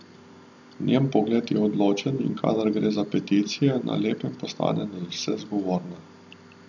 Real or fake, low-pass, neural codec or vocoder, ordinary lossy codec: real; 7.2 kHz; none; none